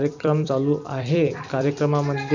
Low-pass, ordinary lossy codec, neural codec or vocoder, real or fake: 7.2 kHz; none; vocoder, 44.1 kHz, 128 mel bands every 256 samples, BigVGAN v2; fake